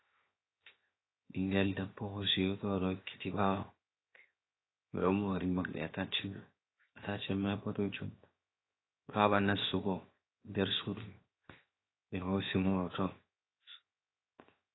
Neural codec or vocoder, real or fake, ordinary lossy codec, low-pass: codec, 16 kHz, 0.7 kbps, FocalCodec; fake; AAC, 16 kbps; 7.2 kHz